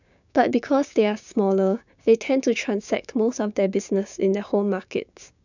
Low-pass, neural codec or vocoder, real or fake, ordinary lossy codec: 7.2 kHz; codec, 16 kHz, 6 kbps, DAC; fake; none